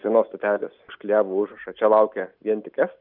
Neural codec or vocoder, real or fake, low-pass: none; real; 5.4 kHz